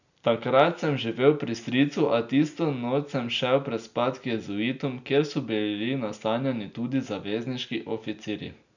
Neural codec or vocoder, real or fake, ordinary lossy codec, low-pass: none; real; none; 7.2 kHz